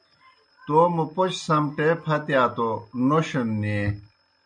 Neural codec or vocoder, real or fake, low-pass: none; real; 9.9 kHz